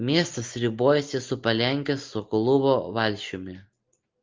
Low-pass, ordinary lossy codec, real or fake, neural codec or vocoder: 7.2 kHz; Opus, 24 kbps; real; none